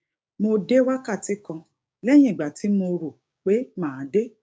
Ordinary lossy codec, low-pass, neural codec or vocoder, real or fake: none; none; codec, 16 kHz, 6 kbps, DAC; fake